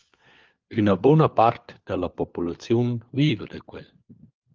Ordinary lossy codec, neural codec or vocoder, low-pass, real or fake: Opus, 32 kbps; codec, 16 kHz, 4 kbps, FunCodec, trained on LibriTTS, 50 frames a second; 7.2 kHz; fake